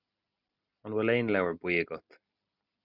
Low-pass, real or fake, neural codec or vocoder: 5.4 kHz; real; none